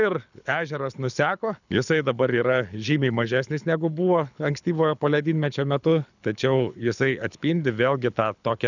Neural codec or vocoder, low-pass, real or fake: codec, 24 kHz, 6 kbps, HILCodec; 7.2 kHz; fake